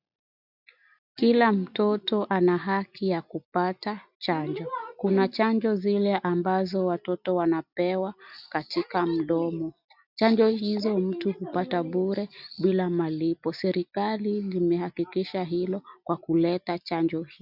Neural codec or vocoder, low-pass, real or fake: none; 5.4 kHz; real